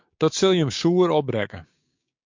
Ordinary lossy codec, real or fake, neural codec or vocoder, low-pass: MP3, 48 kbps; fake; codec, 16 kHz, 6 kbps, DAC; 7.2 kHz